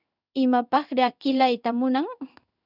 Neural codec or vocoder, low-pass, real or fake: codec, 16 kHz in and 24 kHz out, 1 kbps, XY-Tokenizer; 5.4 kHz; fake